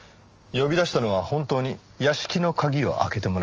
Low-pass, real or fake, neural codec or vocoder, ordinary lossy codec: 7.2 kHz; real; none; Opus, 24 kbps